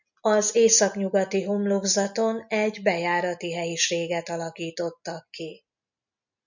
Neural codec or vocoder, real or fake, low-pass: none; real; 7.2 kHz